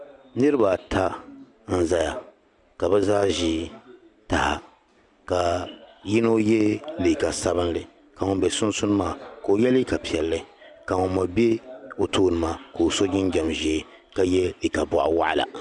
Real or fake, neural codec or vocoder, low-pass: real; none; 10.8 kHz